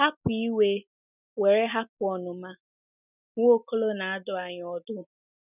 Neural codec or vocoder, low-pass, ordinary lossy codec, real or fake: none; 3.6 kHz; none; real